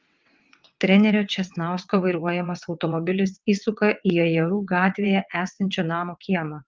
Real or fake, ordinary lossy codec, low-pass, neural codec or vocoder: fake; Opus, 32 kbps; 7.2 kHz; vocoder, 22.05 kHz, 80 mel bands, WaveNeXt